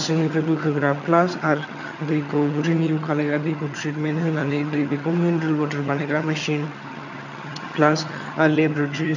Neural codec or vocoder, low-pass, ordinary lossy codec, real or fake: vocoder, 22.05 kHz, 80 mel bands, HiFi-GAN; 7.2 kHz; none; fake